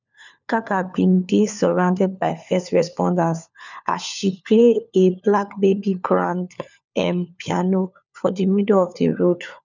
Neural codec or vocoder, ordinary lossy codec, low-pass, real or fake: codec, 16 kHz, 4 kbps, FunCodec, trained on LibriTTS, 50 frames a second; none; 7.2 kHz; fake